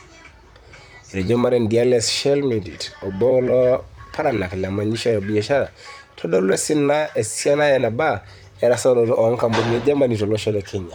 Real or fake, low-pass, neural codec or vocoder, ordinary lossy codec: fake; 19.8 kHz; vocoder, 44.1 kHz, 128 mel bands, Pupu-Vocoder; none